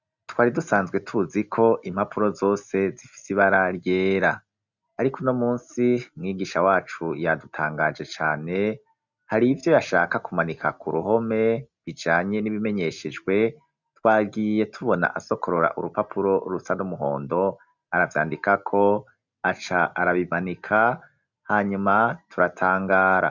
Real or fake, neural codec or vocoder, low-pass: real; none; 7.2 kHz